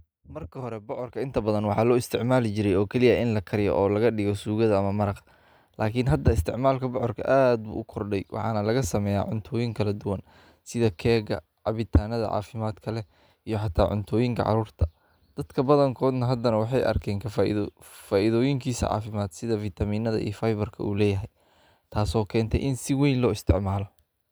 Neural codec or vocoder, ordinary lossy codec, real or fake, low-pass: none; none; real; none